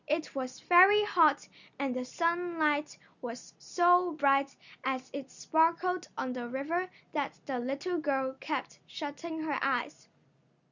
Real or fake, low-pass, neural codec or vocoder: real; 7.2 kHz; none